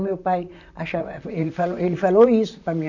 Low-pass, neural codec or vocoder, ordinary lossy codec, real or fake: 7.2 kHz; vocoder, 44.1 kHz, 128 mel bands, Pupu-Vocoder; none; fake